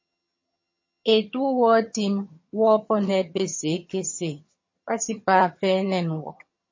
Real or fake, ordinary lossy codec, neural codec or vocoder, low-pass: fake; MP3, 32 kbps; vocoder, 22.05 kHz, 80 mel bands, HiFi-GAN; 7.2 kHz